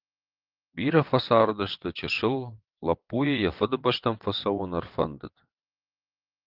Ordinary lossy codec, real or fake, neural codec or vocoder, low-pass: Opus, 32 kbps; fake; vocoder, 22.05 kHz, 80 mel bands, WaveNeXt; 5.4 kHz